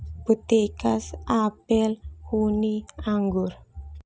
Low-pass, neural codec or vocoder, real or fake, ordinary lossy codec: none; none; real; none